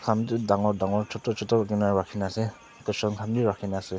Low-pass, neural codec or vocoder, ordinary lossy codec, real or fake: none; none; none; real